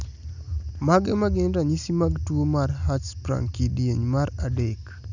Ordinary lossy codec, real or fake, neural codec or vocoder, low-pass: none; real; none; 7.2 kHz